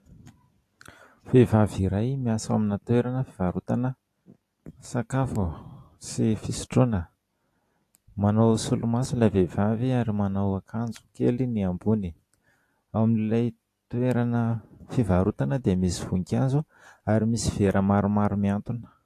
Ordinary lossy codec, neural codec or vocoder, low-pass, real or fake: AAC, 64 kbps; none; 14.4 kHz; real